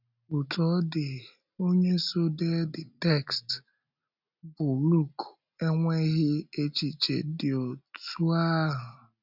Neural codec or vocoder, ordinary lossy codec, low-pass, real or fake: none; none; 5.4 kHz; real